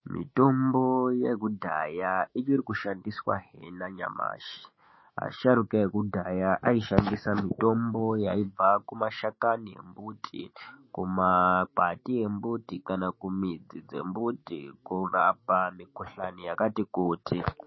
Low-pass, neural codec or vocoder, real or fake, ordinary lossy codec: 7.2 kHz; autoencoder, 48 kHz, 128 numbers a frame, DAC-VAE, trained on Japanese speech; fake; MP3, 24 kbps